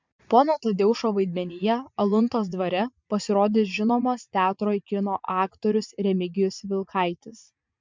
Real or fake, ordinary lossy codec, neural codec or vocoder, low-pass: fake; MP3, 64 kbps; vocoder, 44.1 kHz, 80 mel bands, Vocos; 7.2 kHz